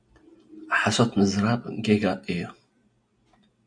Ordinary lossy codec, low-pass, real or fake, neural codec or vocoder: MP3, 64 kbps; 9.9 kHz; real; none